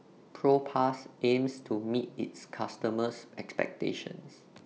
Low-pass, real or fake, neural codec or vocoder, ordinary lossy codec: none; real; none; none